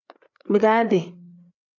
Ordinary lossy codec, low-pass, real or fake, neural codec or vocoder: AAC, 48 kbps; 7.2 kHz; fake; codec, 16 kHz, 4 kbps, FreqCodec, larger model